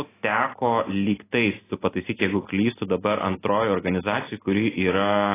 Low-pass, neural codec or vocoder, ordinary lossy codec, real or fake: 3.6 kHz; none; AAC, 16 kbps; real